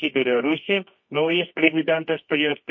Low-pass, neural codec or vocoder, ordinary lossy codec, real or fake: 7.2 kHz; codec, 24 kHz, 0.9 kbps, WavTokenizer, medium music audio release; MP3, 32 kbps; fake